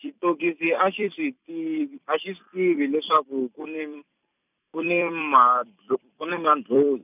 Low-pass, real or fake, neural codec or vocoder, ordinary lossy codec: 3.6 kHz; fake; vocoder, 44.1 kHz, 128 mel bands every 256 samples, BigVGAN v2; none